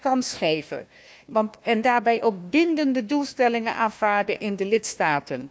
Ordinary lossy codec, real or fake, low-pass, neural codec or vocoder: none; fake; none; codec, 16 kHz, 1 kbps, FunCodec, trained on Chinese and English, 50 frames a second